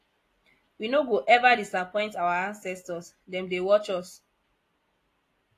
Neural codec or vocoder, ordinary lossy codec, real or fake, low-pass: none; AAC, 48 kbps; real; 14.4 kHz